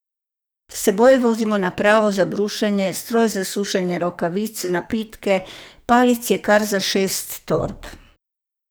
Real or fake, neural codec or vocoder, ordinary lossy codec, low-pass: fake; codec, 44.1 kHz, 2.6 kbps, SNAC; none; none